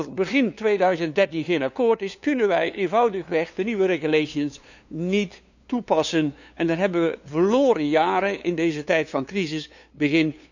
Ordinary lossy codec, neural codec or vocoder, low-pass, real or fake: none; codec, 16 kHz, 2 kbps, FunCodec, trained on LibriTTS, 25 frames a second; 7.2 kHz; fake